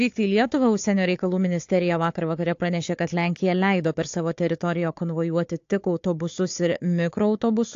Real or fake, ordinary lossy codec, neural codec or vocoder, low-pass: fake; AAC, 48 kbps; codec, 16 kHz, 4 kbps, FunCodec, trained on Chinese and English, 50 frames a second; 7.2 kHz